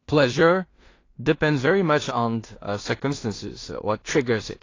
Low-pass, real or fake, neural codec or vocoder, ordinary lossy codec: 7.2 kHz; fake; codec, 16 kHz in and 24 kHz out, 0.4 kbps, LongCat-Audio-Codec, two codebook decoder; AAC, 32 kbps